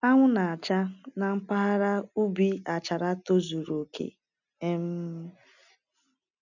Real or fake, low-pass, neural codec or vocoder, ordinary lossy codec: real; 7.2 kHz; none; none